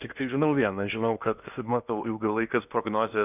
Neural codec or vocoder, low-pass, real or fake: codec, 16 kHz in and 24 kHz out, 0.8 kbps, FocalCodec, streaming, 65536 codes; 3.6 kHz; fake